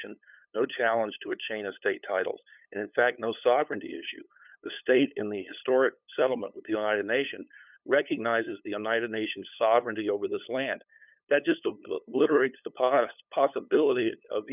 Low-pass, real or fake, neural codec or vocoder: 3.6 kHz; fake; codec, 16 kHz, 8 kbps, FunCodec, trained on LibriTTS, 25 frames a second